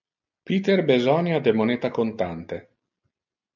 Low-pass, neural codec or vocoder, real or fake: 7.2 kHz; none; real